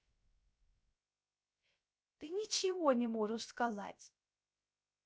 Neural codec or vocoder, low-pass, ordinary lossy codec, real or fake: codec, 16 kHz, 0.3 kbps, FocalCodec; none; none; fake